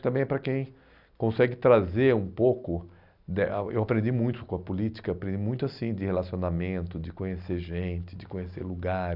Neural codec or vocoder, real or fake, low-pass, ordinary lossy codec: none; real; 5.4 kHz; none